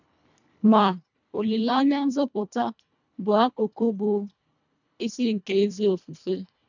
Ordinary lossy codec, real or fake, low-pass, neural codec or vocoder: none; fake; 7.2 kHz; codec, 24 kHz, 1.5 kbps, HILCodec